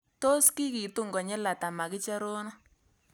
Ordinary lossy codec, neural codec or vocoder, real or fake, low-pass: none; none; real; none